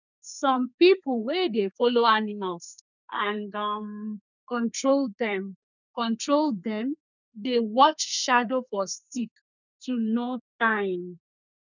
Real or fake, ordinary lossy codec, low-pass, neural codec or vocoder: fake; none; 7.2 kHz; codec, 32 kHz, 1.9 kbps, SNAC